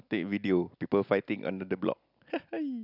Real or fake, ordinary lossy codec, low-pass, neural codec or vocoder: real; none; 5.4 kHz; none